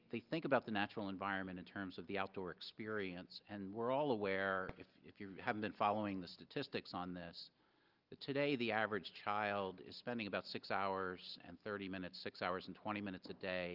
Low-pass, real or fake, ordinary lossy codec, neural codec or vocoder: 5.4 kHz; real; Opus, 24 kbps; none